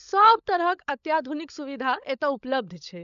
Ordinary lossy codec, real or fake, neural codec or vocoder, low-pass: none; fake; codec, 16 kHz, 16 kbps, FunCodec, trained on LibriTTS, 50 frames a second; 7.2 kHz